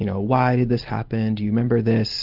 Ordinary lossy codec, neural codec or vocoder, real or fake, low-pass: Opus, 32 kbps; none; real; 5.4 kHz